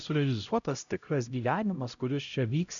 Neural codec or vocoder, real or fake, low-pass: codec, 16 kHz, 0.5 kbps, X-Codec, HuBERT features, trained on LibriSpeech; fake; 7.2 kHz